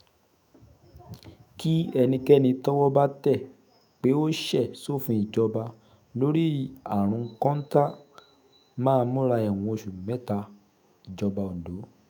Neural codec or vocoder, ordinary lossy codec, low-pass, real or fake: autoencoder, 48 kHz, 128 numbers a frame, DAC-VAE, trained on Japanese speech; none; none; fake